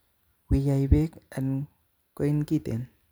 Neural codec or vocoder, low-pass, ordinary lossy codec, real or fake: vocoder, 44.1 kHz, 128 mel bands every 256 samples, BigVGAN v2; none; none; fake